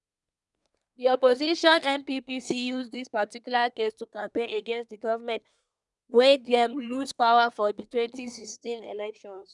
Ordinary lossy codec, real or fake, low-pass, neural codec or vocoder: none; fake; 10.8 kHz; codec, 24 kHz, 1 kbps, SNAC